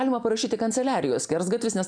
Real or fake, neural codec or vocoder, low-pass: real; none; 9.9 kHz